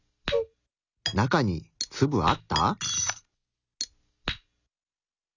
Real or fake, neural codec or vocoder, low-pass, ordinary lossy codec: real; none; 7.2 kHz; none